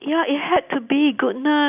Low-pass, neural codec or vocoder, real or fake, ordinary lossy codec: 3.6 kHz; none; real; none